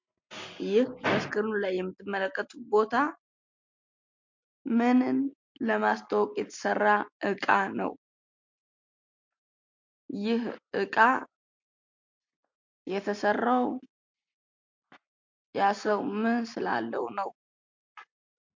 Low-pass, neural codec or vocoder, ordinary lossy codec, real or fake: 7.2 kHz; none; MP3, 48 kbps; real